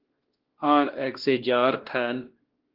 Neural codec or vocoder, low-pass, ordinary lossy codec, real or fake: codec, 16 kHz, 1 kbps, X-Codec, WavLM features, trained on Multilingual LibriSpeech; 5.4 kHz; Opus, 16 kbps; fake